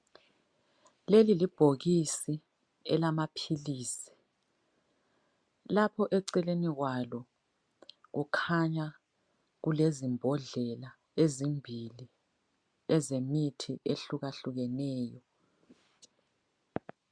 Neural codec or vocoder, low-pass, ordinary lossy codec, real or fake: none; 9.9 kHz; MP3, 64 kbps; real